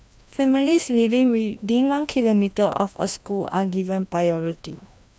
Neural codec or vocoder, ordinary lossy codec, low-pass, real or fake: codec, 16 kHz, 1 kbps, FreqCodec, larger model; none; none; fake